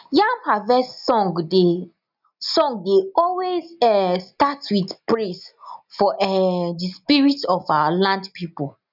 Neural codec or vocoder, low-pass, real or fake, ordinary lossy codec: none; 5.4 kHz; real; none